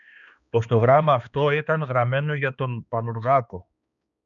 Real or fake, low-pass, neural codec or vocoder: fake; 7.2 kHz; codec, 16 kHz, 2 kbps, X-Codec, HuBERT features, trained on balanced general audio